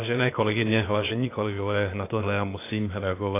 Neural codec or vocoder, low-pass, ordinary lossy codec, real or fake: codec, 16 kHz, 0.8 kbps, ZipCodec; 3.6 kHz; AAC, 24 kbps; fake